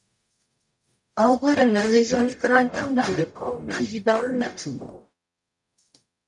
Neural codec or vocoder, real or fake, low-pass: codec, 44.1 kHz, 0.9 kbps, DAC; fake; 10.8 kHz